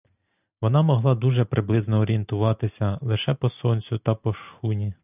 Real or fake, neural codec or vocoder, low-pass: real; none; 3.6 kHz